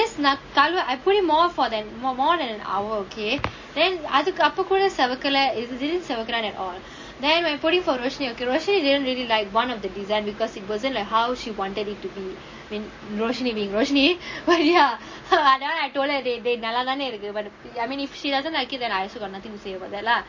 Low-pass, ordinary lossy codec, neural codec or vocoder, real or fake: 7.2 kHz; MP3, 32 kbps; none; real